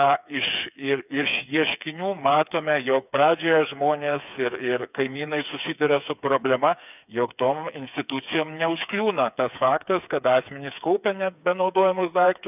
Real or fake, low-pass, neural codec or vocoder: fake; 3.6 kHz; codec, 16 kHz, 4 kbps, FreqCodec, smaller model